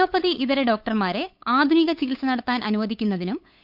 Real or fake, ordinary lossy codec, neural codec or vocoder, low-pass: fake; none; codec, 16 kHz, 8 kbps, FunCodec, trained on LibriTTS, 25 frames a second; 5.4 kHz